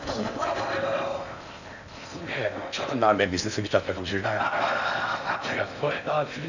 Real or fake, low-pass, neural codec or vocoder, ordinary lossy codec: fake; 7.2 kHz; codec, 16 kHz in and 24 kHz out, 0.6 kbps, FocalCodec, streaming, 4096 codes; none